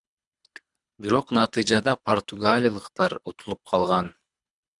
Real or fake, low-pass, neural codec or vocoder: fake; 10.8 kHz; codec, 24 kHz, 3 kbps, HILCodec